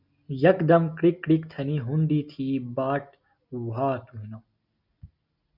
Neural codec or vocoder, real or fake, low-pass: none; real; 5.4 kHz